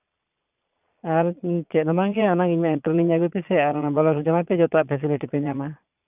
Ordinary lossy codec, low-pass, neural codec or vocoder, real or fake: none; 3.6 kHz; vocoder, 22.05 kHz, 80 mel bands, Vocos; fake